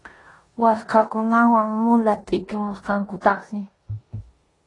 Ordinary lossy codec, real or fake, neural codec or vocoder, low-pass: AAC, 32 kbps; fake; codec, 16 kHz in and 24 kHz out, 0.9 kbps, LongCat-Audio-Codec, four codebook decoder; 10.8 kHz